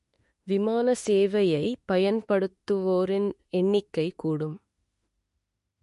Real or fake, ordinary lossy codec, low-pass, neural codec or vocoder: fake; MP3, 48 kbps; 14.4 kHz; autoencoder, 48 kHz, 32 numbers a frame, DAC-VAE, trained on Japanese speech